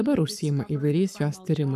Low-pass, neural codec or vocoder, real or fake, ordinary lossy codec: 14.4 kHz; codec, 44.1 kHz, 7.8 kbps, Pupu-Codec; fake; AAC, 96 kbps